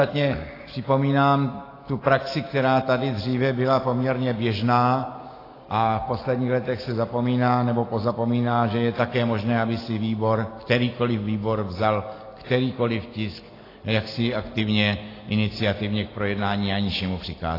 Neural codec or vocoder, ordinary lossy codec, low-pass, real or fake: none; AAC, 24 kbps; 5.4 kHz; real